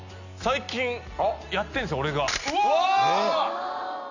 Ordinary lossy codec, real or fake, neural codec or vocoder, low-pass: none; real; none; 7.2 kHz